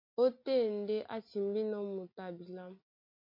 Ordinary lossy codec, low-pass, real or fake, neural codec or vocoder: MP3, 32 kbps; 5.4 kHz; real; none